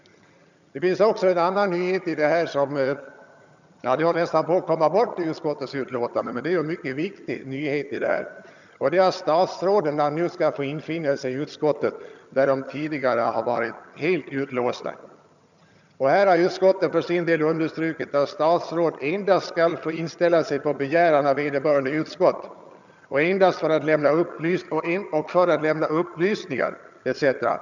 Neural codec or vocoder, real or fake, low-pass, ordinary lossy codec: vocoder, 22.05 kHz, 80 mel bands, HiFi-GAN; fake; 7.2 kHz; none